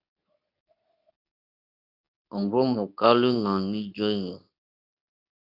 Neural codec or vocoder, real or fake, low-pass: codec, 24 kHz, 0.9 kbps, WavTokenizer, medium speech release version 2; fake; 5.4 kHz